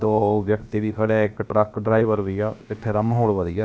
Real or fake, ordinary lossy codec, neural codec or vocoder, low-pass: fake; none; codec, 16 kHz, about 1 kbps, DyCAST, with the encoder's durations; none